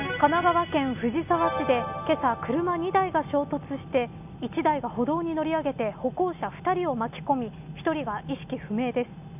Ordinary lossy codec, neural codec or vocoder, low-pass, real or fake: none; none; 3.6 kHz; real